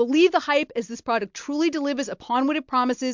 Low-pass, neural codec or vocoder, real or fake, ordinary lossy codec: 7.2 kHz; none; real; MP3, 64 kbps